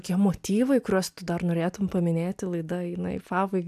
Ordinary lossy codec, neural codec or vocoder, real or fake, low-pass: AAC, 96 kbps; none; real; 14.4 kHz